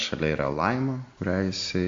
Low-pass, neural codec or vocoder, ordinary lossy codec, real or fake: 7.2 kHz; none; AAC, 48 kbps; real